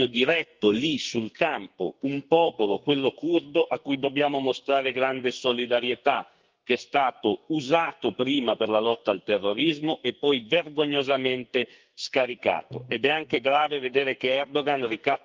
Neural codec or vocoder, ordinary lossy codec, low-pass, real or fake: codec, 44.1 kHz, 2.6 kbps, SNAC; Opus, 32 kbps; 7.2 kHz; fake